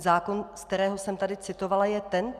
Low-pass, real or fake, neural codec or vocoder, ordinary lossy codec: 14.4 kHz; real; none; Opus, 64 kbps